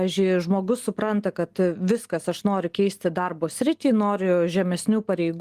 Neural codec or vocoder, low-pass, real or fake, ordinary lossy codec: none; 14.4 kHz; real; Opus, 24 kbps